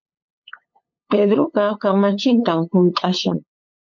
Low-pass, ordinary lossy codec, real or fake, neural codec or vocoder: 7.2 kHz; MP3, 48 kbps; fake; codec, 16 kHz, 8 kbps, FunCodec, trained on LibriTTS, 25 frames a second